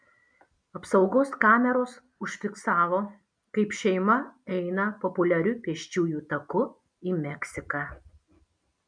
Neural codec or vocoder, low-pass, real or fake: none; 9.9 kHz; real